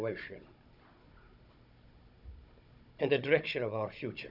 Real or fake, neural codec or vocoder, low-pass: fake; codec, 16 kHz, 16 kbps, FunCodec, trained on Chinese and English, 50 frames a second; 5.4 kHz